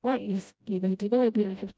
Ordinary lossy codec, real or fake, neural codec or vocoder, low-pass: none; fake; codec, 16 kHz, 0.5 kbps, FreqCodec, smaller model; none